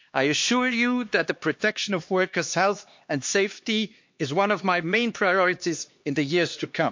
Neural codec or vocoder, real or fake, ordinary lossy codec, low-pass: codec, 16 kHz, 2 kbps, X-Codec, HuBERT features, trained on LibriSpeech; fake; MP3, 48 kbps; 7.2 kHz